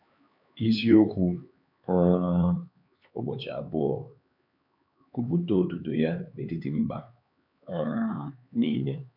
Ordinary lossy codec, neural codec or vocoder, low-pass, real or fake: none; codec, 16 kHz, 4 kbps, X-Codec, HuBERT features, trained on LibriSpeech; 5.4 kHz; fake